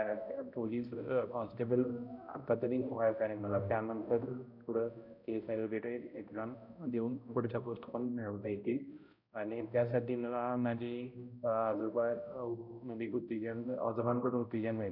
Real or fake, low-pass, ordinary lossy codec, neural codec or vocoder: fake; 5.4 kHz; none; codec, 16 kHz, 0.5 kbps, X-Codec, HuBERT features, trained on balanced general audio